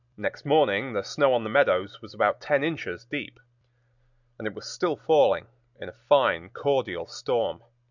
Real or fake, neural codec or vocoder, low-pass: real; none; 7.2 kHz